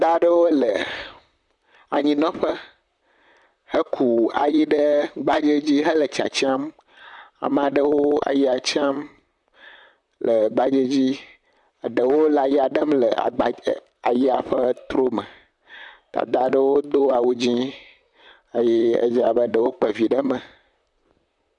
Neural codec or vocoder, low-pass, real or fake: vocoder, 44.1 kHz, 128 mel bands, Pupu-Vocoder; 10.8 kHz; fake